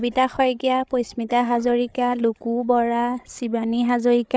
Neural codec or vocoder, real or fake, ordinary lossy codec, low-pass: codec, 16 kHz, 8 kbps, FreqCodec, larger model; fake; none; none